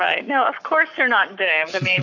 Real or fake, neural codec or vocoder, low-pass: fake; codec, 44.1 kHz, 7.8 kbps, Pupu-Codec; 7.2 kHz